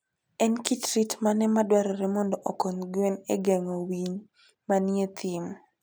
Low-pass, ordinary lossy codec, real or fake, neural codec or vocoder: none; none; real; none